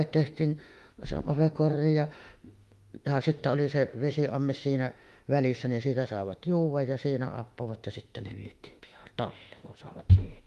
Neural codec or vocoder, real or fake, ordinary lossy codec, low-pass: autoencoder, 48 kHz, 32 numbers a frame, DAC-VAE, trained on Japanese speech; fake; Opus, 32 kbps; 14.4 kHz